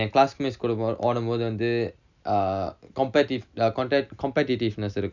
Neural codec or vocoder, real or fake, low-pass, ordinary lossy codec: none; real; 7.2 kHz; none